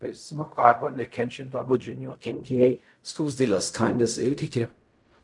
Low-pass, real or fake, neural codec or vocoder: 10.8 kHz; fake; codec, 16 kHz in and 24 kHz out, 0.4 kbps, LongCat-Audio-Codec, fine tuned four codebook decoder